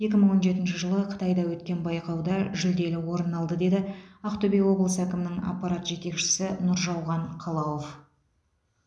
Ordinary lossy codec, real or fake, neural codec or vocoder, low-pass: none; real; none; none